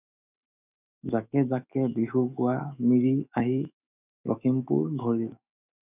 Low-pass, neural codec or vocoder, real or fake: 3.6 kHz; autoencoder, 48 kHz, 128 numbers a frame, DAC-VAE, trained on Japanese speech; fake